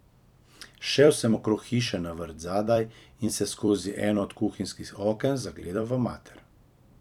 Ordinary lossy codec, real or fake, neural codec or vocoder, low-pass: none; fake; vocoder, 44.1 kHz, 128 mel bands every 256 samples, BigVGAN v2; 19.8 kHz